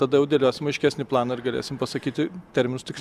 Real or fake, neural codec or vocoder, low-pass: real; none; 14.4 kHz